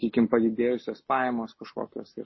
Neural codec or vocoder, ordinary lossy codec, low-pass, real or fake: none; MP3, 24 kbps; 7.2 kHz; real